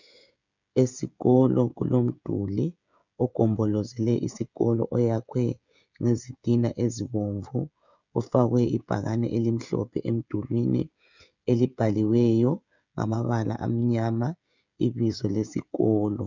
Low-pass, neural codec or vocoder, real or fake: 7.2 kHz; codec, 16 kHz, 16 kbps, FreqCodec, smaller model; fake